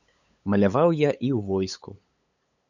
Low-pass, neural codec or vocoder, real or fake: 7.2 kHz; codec, 16 kHz, 8 kbps, FunCodec, trained on LibriTTS, 25 frames a second; fake